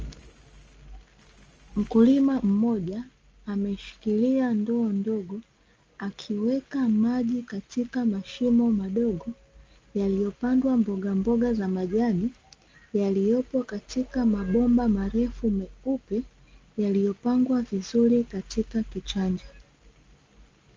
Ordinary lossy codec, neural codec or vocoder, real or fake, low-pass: Opus, 24 kbps; none; real; 7.2 kHz